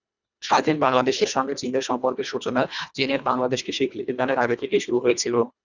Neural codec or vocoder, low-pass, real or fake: codec, 24 kHz, 1.5 kbps, HILCodec; 7.2 kHz; fake